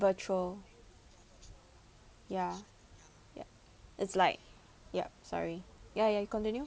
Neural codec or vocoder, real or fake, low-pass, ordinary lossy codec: none; real; none; none